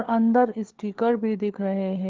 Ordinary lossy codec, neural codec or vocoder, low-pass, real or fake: Opus, 16 kbps; codec, 16 kHz, 4 kbps, FunCodec, trained on LibriTTS, 50 frames a second; 7.2 kHz; fake